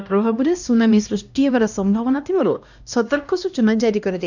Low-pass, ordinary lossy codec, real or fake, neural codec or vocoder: 7.2 kHz; none; fake; codec, 16 kHz, 1 kbps, X-Codec, HuBERT features, trained on LibriSpeech